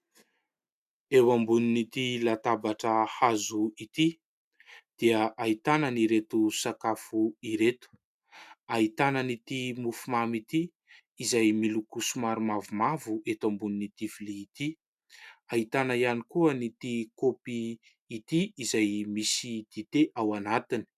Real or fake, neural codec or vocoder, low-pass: real; none; 14.4 kHz